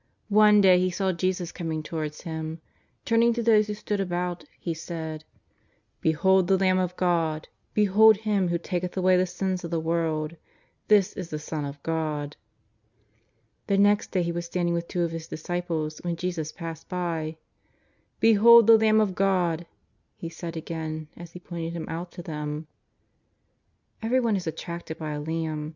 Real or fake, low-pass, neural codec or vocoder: real; 7.2 kHz; none